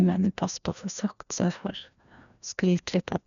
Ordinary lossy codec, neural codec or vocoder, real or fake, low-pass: none; codec, 16 kHz, 1 kbps, FreqCodec, larger model; fake; 7.2 kHz